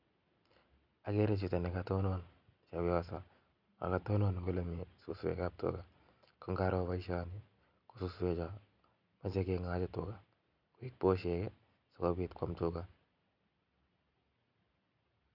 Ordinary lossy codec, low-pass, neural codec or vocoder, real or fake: none; 5.4 kHz; none; real